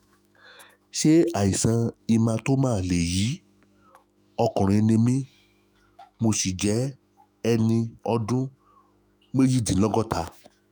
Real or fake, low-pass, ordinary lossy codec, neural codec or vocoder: fake; none; none; autoencoder, 48 kHz, 128 numbers a frame, DAC-VAE, trained on Japanese speech